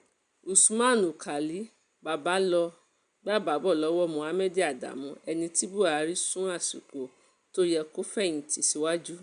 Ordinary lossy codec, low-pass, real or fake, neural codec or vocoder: none; 9.9 kHz; real; none